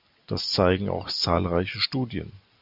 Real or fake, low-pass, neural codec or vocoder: real; 5.4 kHz; none